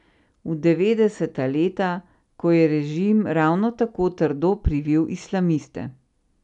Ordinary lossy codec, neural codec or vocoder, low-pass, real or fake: none; none; 10.8 kHz; real